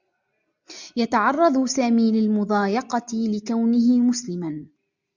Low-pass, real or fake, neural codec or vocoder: 7.2 kHz; real; none